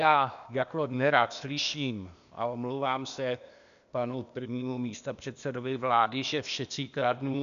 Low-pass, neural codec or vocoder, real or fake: 7.2 kHz; codec, 16 kHz, 0.8 kbps, ZipCodec; fake